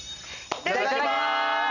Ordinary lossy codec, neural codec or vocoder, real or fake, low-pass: none; none; real; 7.2 kHz